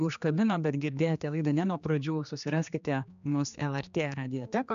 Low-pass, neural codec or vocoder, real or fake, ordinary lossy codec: 7.2 kHz; codec, 16 kHz, 2 kbps, X-Codec, HuBERT features, trained on general audio; fake; AAC, 96 kbps